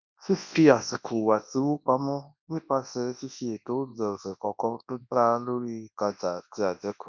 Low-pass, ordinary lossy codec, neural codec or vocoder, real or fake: 7.2 kHz; none; codec, 24 kHz, 0.9 kbps, WavTokenizer, large speech release; fake